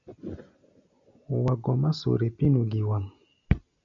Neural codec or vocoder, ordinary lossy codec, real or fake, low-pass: none; MP3, 96 kbps; real; 7.2 kHz